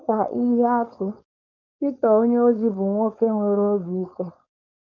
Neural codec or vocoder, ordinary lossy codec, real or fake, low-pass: codec, 16 kHz, 4.8 kbps, FACodec; none; fake; 7.2 kHz